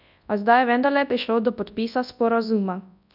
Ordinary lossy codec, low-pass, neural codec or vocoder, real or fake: none; 5.4 kHz; codec, 24 kHz, 0.9 kbps, WavTokenizer, large speech release; fake